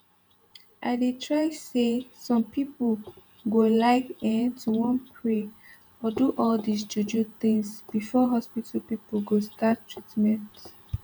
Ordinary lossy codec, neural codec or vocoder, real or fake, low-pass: none; vocoder, 48 kHz, 128 mel bands, Vocos; fake; none